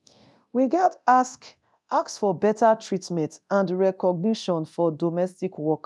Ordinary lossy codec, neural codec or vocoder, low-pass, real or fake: none; codec, 24 kHz, 0.9 kbps, DualCodec; none; fake